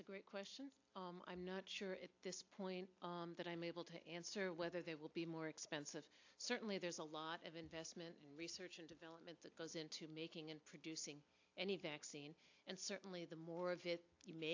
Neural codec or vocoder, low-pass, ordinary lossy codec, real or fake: none; 7.2 kHz; AAC, 48 kbps; real